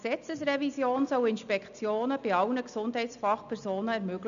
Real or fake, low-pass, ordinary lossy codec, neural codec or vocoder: real; 7.2 kHz; none; none